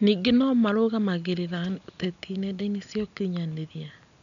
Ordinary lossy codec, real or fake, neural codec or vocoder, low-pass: none; real; none; 7.2 kHz